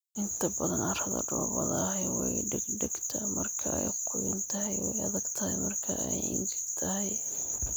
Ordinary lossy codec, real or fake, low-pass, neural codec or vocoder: none; real; none; none